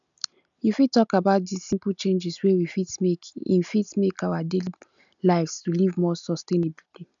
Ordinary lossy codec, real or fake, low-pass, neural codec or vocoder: none; real; 7.2 kHz; none